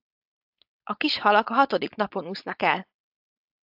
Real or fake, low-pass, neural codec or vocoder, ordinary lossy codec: fake; 5.4 kHz; codec, 16 kHz, 4.8 kbps, FACodec; AAC, 48 kbps